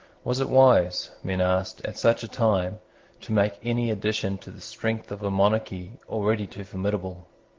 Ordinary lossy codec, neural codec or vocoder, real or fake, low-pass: Opus, 16 kbps; none; real; 7.2 kHz